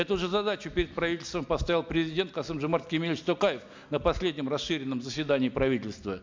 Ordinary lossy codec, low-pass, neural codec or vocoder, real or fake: MP3, 64 kbps; 7.2 kHz; none; real